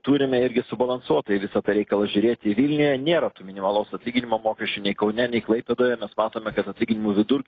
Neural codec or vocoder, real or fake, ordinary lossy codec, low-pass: none; real; AAC, 32 kbps; 7.2 kHz